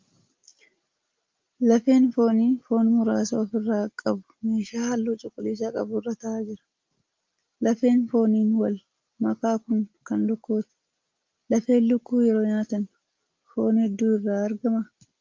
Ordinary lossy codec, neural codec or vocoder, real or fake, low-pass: Opus, 32 kbps; none; real; 7.2 kHz